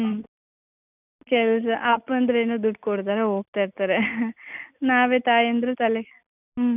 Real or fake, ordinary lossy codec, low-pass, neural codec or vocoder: real; none; 3.6 kHz; none